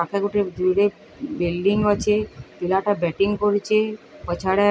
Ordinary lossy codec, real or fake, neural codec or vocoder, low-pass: none; real; none; none